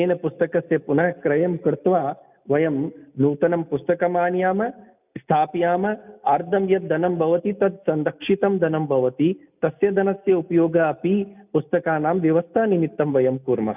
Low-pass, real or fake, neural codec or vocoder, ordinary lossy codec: 3.6 kHz; real; none; none